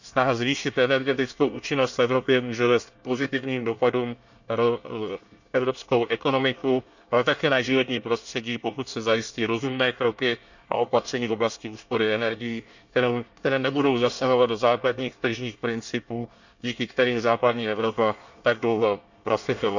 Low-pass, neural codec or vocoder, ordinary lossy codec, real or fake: 7.2 kHz; codec, 24 kHz, 1 kbps, SNAC; none; fake